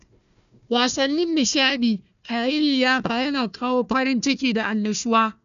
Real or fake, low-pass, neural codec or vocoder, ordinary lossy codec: fake; 7.2 kHz; codec, 16 kHz, 1 kbps, FunCodec, trained on Chinese and English, 50 frames a second; none